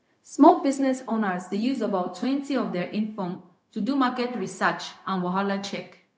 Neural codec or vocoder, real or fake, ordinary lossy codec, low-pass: codec, 16 kHz, 0.4 kbps, LongCat-Audio-Codec; fake; none; none